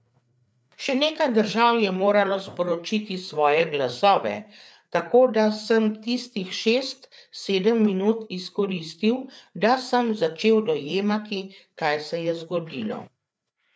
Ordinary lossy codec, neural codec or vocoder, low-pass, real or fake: none; codec, 16 kHz, 4 kbps, FreqCodec, larger model; none; fake